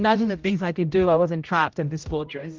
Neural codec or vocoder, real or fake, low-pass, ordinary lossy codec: codec, 16 kHz, 0.5 kbps, X-Codec, HuBERT features, trained on general audio; fake; 7.2 kHz; Opus, 32 kbps